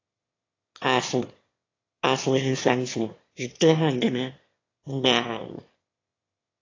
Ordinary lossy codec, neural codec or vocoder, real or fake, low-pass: AAC, 32 kbps; autoencoder, 22.05 kHz, a latent of 192 numbers a frame, VITS, trained on one speaker; fake; 7.2 kHz